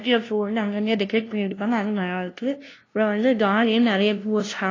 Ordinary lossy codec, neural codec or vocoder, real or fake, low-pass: AAC, 32 kbps; codec, 16 kHz, 0.5 kbps, FunCodec, trained on LibriTTS, 25 frames a second; fake; 7.2 kHz